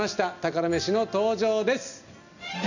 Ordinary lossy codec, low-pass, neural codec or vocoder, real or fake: none; 7.2 kHz; none; real